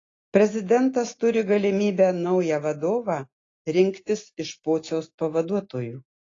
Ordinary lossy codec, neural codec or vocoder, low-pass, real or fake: AAC, 32 kbps; none; 7.2 kHz; real